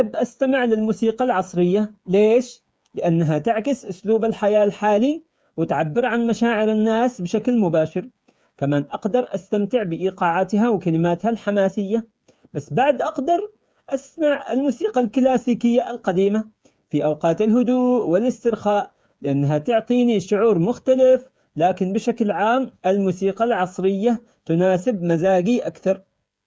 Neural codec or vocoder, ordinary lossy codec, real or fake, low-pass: codec, 16 kHz, 8 kbps, FreqCodec, smaller model; none; fake; none